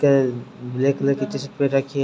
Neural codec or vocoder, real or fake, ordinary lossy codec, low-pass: none; real; none; none